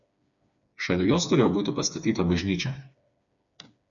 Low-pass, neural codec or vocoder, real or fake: 7.2 kHz; codec, 16 kHz, 4 kbps, FreqCodec, smaller model; fake